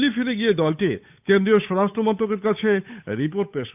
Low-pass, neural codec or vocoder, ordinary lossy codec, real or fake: 3.6 kHz; codec, 16 kHz, 8 kbps, FunCodec, trained on Chinese and English, 25 frames a second; none; fake